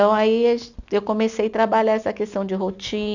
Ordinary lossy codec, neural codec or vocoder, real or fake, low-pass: none; none; real; 7.2 kHz